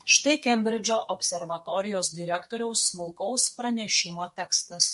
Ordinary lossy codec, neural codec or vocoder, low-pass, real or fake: MP3, 48 kbps; codec, 32 kHz, 1.9 kbps, SNAC; 14.4 kHz; fake